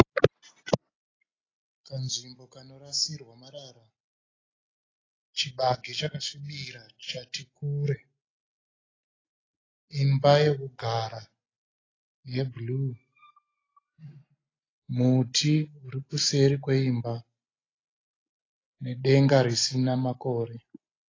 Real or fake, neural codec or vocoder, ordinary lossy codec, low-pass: real; none; AAC, 32 kbps; 7.2 kHz